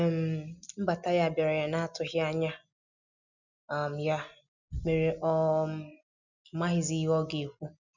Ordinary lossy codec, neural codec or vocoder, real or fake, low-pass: none; none; real; 7.2 kHz